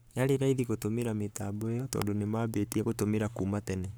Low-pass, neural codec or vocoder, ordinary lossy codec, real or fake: none; codec, 44.1 kHz, 7.8 kbps, Pupu-Codec; none; fake